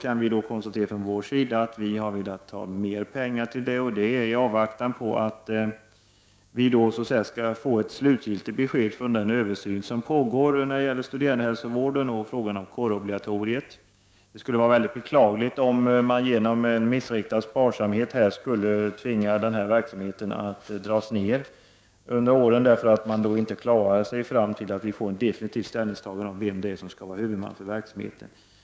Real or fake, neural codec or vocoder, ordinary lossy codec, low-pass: fake; codec, 16 kHz, 6 kbps, DAC; none; none